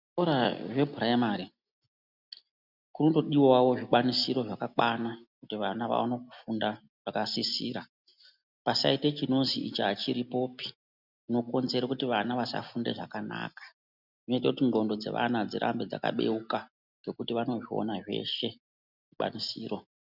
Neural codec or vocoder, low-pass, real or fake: none; 5.4 kHz; real